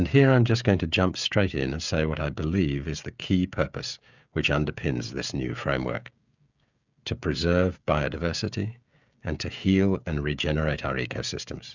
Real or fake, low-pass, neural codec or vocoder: fake; 7.2 kHz; codec, 16 kHz, 16 kbps, FreqCodec, smaller model